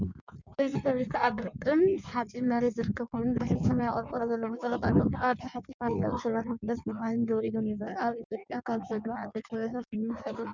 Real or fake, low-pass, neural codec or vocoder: fake; 7.2 kHz; codec, 16 kHz in and 24 kHz out, 1.1 kbps, FireRedTTS-2 codec